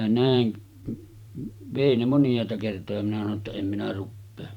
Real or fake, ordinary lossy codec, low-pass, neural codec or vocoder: fake; none; 19.8 kHz; vocoder, 44.1 kHz, 128 mel bands every 512 samples, BigVGAN v2